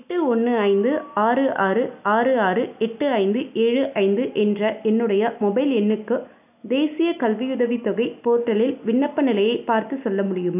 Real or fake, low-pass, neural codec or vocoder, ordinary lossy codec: real; 3.6 kHz; none; none